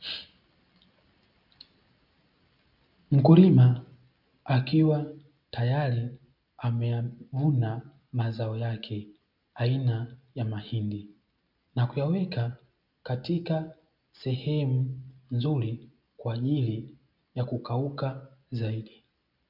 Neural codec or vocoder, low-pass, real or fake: none; 5.4 kHz; real